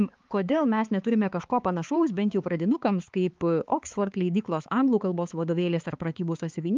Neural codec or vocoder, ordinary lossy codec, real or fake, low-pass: codec, 16 kHz, 4 kbps, X-Codec, HuBERT features, trained on balanced general audio; Opus, 32 kbps; fake; 7.2 kHz